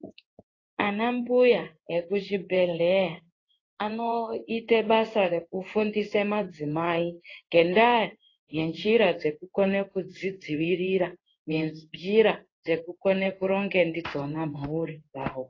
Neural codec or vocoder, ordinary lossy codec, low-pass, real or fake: codec, 16 kHz in and 24 kHz out, 1 kbps, XY-Tokenizer; AAC, 32 kbps; 7.2 kHz; fake